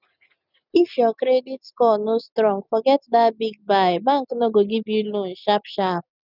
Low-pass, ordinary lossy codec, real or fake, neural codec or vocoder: 5.4 kHz; none; real; none